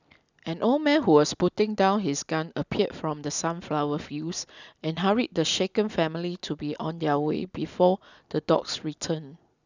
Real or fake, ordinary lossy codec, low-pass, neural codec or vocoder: real; none; 7.2 kHz; none